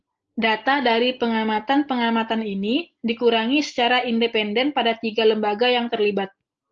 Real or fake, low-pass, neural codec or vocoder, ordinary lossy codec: real; 7.2 kHz; none; Opus, 24 kbps